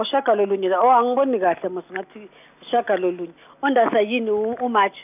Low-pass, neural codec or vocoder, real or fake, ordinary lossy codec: 3.6 kHz; none; real; none